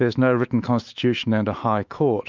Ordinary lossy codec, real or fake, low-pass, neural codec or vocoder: Opus, 24 kbps; fake; 7.2 kHz; autoencoder, 48 kHz, 128 numbers a frame, DAC-VAE, trained on Japanese speech